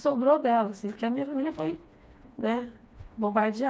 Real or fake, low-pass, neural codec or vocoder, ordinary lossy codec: fake; none; codec, 16 kHz, 2 kbps, FreqCodec, smaller model; none